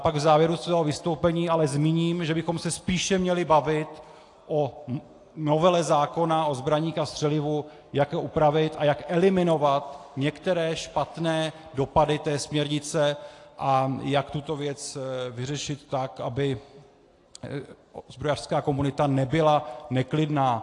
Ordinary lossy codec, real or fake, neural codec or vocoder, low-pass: AAC, 48 kbps; real; none; 10.8 kHz